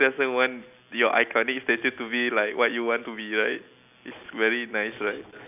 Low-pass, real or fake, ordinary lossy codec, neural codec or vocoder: 3.6 kHz; real; none; none